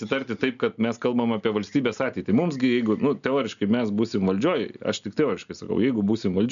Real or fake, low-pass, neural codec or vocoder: real; 7.2 kHz; none